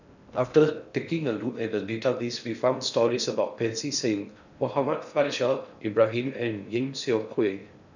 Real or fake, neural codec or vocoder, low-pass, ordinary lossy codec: fake; codec, 16 kHz in and 24 kHz out, 0.6 kbps, FocalCodec, streaming, 4096 codes; 7.2 kHz; none